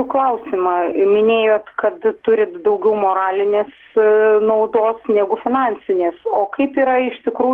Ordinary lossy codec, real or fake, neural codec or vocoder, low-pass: Opus, 16 kbps; real; none; 19.8 kHz